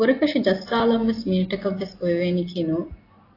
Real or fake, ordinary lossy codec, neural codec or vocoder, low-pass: real; AAC, 24 kbps; none; 5.4 kHz